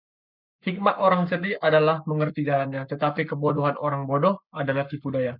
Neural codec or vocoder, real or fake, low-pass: codec, 44.1 kHz, 7.8 kbps, Pupu-Codec; fake; 5.4 kHz